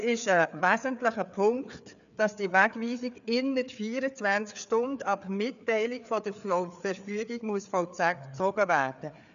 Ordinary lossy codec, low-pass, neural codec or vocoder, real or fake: none; 7.2 kHz; codec, 16 kHz, 4 kbps, FreqCodec, larger model; fake